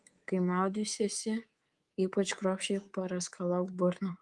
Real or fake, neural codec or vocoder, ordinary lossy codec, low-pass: fake; codec, 24 kHz, 3.1 kbps, DualCodec; Opus, 24 kbps; 10.8 kHz